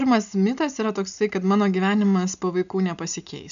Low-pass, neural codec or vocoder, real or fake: 7.2 kHz; none; real